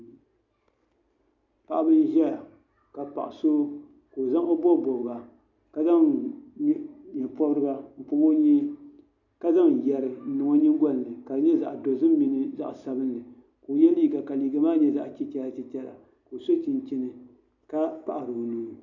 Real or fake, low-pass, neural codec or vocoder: real; 7.2 kHz; none